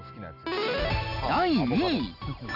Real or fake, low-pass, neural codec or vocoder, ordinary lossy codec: real; 5.4 kHz; none; none